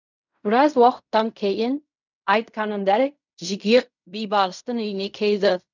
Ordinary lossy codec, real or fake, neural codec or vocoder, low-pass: none; fake; codec, 16 kHz in and 24 kHz out, 0.4 kbps, LongCat-Audio-Codec, fine tuned four codebook decoder; 7.2 kHz